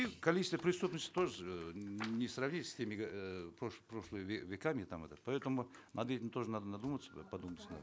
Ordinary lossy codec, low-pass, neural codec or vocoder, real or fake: none; none; none; real